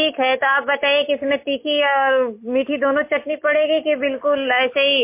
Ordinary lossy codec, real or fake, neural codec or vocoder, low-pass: MP3, 24 kbps; real; none; 3.6 kHz